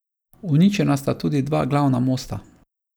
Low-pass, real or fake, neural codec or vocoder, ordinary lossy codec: none; real; none; none